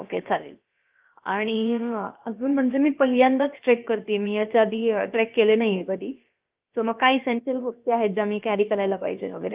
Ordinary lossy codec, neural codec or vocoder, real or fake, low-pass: Opus, 32 kbps; codec, 16 kHz, about 1 kbps, DyCAST, with the encoder's durations; fake; 3.6 kHz